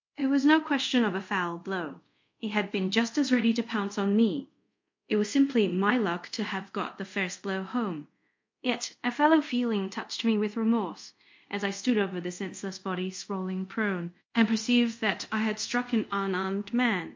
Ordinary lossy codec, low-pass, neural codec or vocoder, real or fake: MP3, 48 kbps; 7.2 kHz; codec, 24 kHz, 0.5 kbps, DualCodec; fake